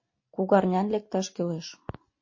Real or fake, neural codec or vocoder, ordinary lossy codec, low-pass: real; none; MP3, 32 kbps; 7.2 kHz